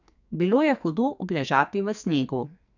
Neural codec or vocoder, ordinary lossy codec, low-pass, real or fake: codec, 44.1 kHz, 2.6 kbps, SNAC; none; 7.2 kHz; fake